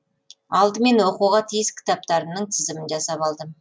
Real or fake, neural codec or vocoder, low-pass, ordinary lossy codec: real; none; none; none